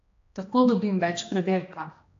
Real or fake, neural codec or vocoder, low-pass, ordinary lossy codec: fake; codec, 16 kHz, 1 kbps, X-Codec, HuBERT features, trained on general audio; 7.2 kHz; AAC, 48 kbps